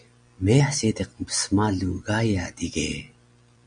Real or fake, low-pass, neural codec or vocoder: real; 9.9 kHz; none